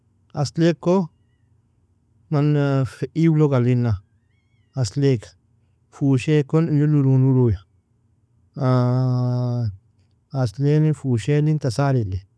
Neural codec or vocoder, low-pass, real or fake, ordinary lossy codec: none; none; real; none